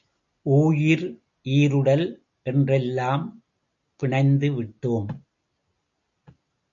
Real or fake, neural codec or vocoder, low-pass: real; none; 7.2 kHz